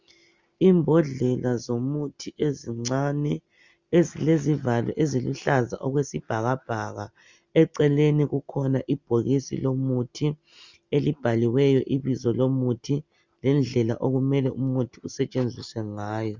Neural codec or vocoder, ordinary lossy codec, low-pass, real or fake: none; Opus, 64 kbps; 7.2 kHz; real